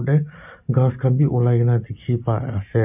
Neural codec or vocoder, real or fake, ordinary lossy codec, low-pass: none; real; none; 3.6 kHz